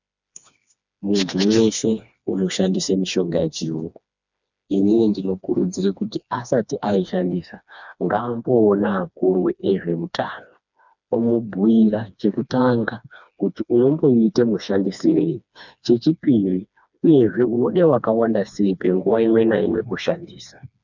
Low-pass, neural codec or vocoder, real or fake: 7.2 kHz; codec, 16 kHz, 2 kbps, FreqCodec, smaller model; fake